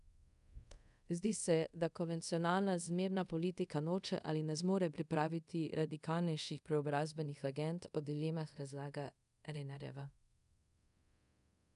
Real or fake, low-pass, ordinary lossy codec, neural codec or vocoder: fake; 10.8 kHz; none; codec, 24 kHz, 0.5 kbps, DualCodec